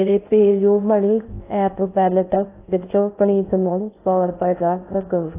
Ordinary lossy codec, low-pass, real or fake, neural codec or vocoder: AAC, 24 kbps; 3.6 kHz; fake; codec, 16 kHz in and 24 kHz out, 0.6 kbps, FocalCodec, streaming, 2048 codes